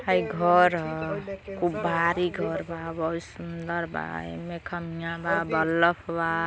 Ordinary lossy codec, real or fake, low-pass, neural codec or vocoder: none; real; none; none